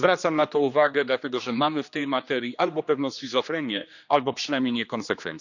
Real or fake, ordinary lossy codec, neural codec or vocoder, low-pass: fake; none; codec, 16 kHz, 2 kbps, X-Codec, HuBERT features, trained on general audio; 7.2 kHz